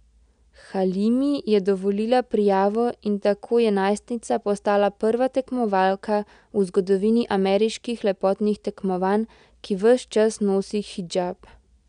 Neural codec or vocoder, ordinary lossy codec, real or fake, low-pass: none; none; real; 9.9 kHz